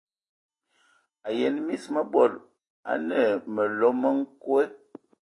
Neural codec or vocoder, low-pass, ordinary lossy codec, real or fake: none; 10.8 kHz; AAC, 32 kbps; real